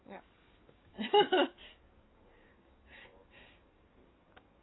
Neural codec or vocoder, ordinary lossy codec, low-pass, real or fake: codec, 44.1 kHz, 7.8 kbps, DAC; AAC, 16 kbps; 7.2 kHz; fake